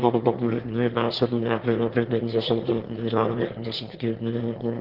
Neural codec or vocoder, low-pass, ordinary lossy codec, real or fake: autoencoder, 22.05 kHz, a latent of 192 numbers a frame, VITS, trained on one speaker; 5.4 kHz; Opus, 16 kbps; fake